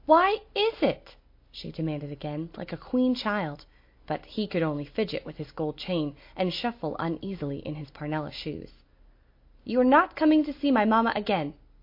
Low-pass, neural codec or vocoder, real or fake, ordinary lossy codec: 5.4 kHz; none; real; MP3, 32 kbps